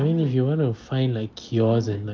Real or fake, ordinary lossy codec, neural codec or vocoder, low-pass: real; Opus, 24 kbps; none; 7.2 kHz